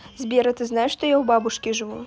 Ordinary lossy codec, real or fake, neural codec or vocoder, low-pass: none; real; none; none